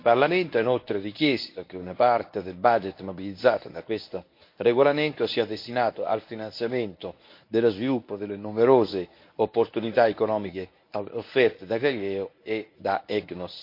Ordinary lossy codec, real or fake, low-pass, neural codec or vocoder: MP3, 32 kbps; fake; 5.4 kHz; codec, 24 kHz, 0.9 kbps, WavTokenizer, medium speech release version 1